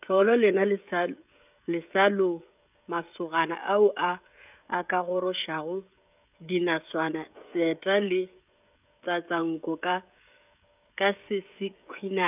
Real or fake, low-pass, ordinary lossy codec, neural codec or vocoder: fake; 3.6 kHz; none; codec, 16 kHz, 16 kbps, FreqCodec, smaller model